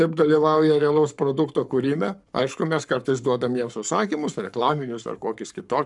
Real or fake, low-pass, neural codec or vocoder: fake; 10.8 kHz; codec, 44.1 kHz, 7.8 kbps, Pupu-Codec